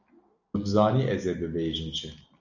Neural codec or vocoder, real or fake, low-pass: none; real; 7.2 kHz